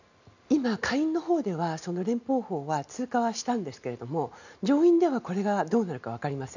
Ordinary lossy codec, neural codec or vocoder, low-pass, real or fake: none; none; 7.2 kHz; real